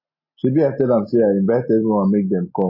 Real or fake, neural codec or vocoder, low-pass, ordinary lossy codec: real; none; 5.4 kHz; MP3, 24 kbps